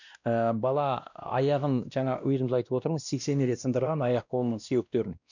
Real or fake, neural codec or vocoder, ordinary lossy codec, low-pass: fake; codec, 16 kHz, 1 kbps, X-Codec, WavLM features, trained on Multilingual LibriSpeech; none; 7.2 kHz